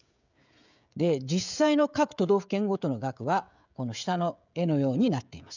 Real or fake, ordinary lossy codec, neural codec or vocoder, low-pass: fake; none; codec, 16 kHz, 16 kbps, FreqCodec, smaller model; 7.2 kHz